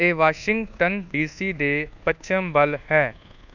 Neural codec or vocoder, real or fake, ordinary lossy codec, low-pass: codec, 24 kHz, 1.2 kbps, DualCodec; fake; none; 7.2 kHz